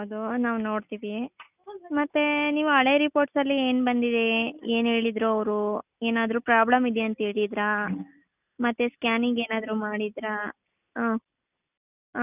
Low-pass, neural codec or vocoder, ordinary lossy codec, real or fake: 3.6 kHz; none; none; real